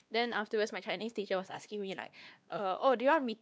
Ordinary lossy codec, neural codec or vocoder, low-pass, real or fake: none; codec, 16 kHz, 2 kbps, X-Codec, WavLM features, trained on Multilingual LibriSpeech; none; fake